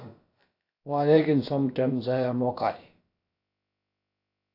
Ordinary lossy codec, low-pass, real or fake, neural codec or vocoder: AAC, 32 kbps; 5.4 kHz; fake; codec, 16 kHz, about 1 kbps, DyCAST, with the encoder's durations